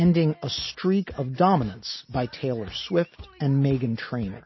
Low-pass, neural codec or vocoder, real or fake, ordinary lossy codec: 7.2 kHz; none; real; MP3, 24 kbps